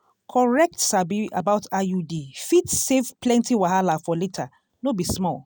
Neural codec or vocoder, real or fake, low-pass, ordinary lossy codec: none; real; none; none